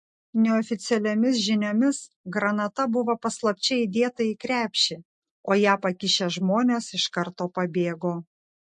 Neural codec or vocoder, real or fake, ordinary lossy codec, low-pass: none; real; MP3, 48 kbps; 10.8 kHz